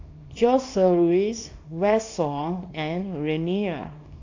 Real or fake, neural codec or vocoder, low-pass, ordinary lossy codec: fake; codec, 24 kHz, 0.9 kbps, WavTokenizer, small release; 7.2 kHz; AAC, 48 kbps